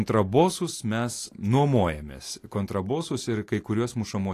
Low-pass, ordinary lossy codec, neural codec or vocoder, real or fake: 14.4 kHz; AAC, 48 kbps; none; real